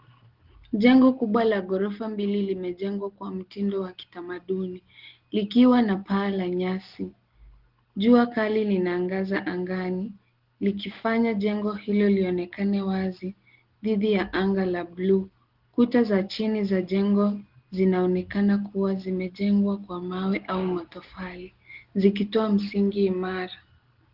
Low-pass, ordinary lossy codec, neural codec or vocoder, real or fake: 5.4 kHz; Opus, 16 kbps; none; real